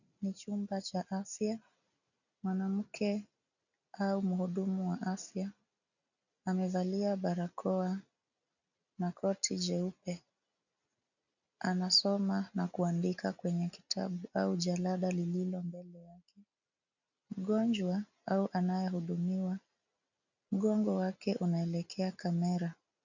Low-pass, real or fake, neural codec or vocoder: 7.2 kHz; real; none